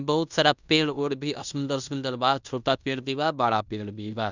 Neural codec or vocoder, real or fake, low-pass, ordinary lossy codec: codec, 16 kHz in and 24 kHz out, 0.9 kbps, LongCat-Audio-Codec, fine tuned four codebook decoder; fake; 7.2 kHz; none